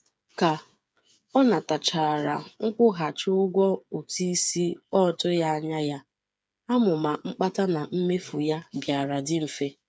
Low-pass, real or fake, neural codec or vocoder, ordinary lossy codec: none; fake; codec, 16 kHz, 8 kbps, FreqCodec, smaller model; none